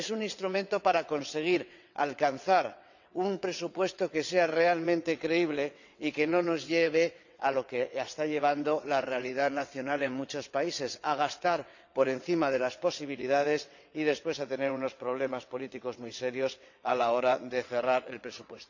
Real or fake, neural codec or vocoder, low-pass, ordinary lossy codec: fake; vocoder, 22.05 kHz, 80 mel bands, WaveNeXt; 7.2 kHz; none